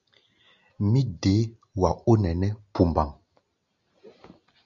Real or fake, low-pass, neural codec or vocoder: real; 7.2 kHz; none